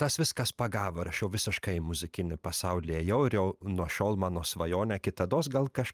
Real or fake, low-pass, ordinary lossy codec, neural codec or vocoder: fake; 14.4 kHz; Opus, 32 kbps; vocoder, 44.1 kHz, 128 mel bands every 512 samples, BigVGAN v2